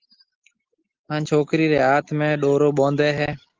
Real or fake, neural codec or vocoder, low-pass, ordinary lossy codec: real; none; 7.2 kHz; Opus, 16 kbps